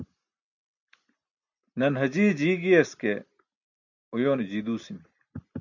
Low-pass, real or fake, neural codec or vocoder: 7.2 kHz; real; none